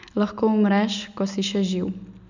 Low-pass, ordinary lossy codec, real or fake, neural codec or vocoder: 7.2 kHz; none; real; none